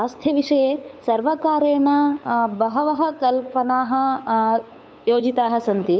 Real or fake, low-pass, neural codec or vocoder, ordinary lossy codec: fake; none; codec, 16 kHz, 8 kbps, FunCodec, trained on LibriTTS, 25 frames a second; none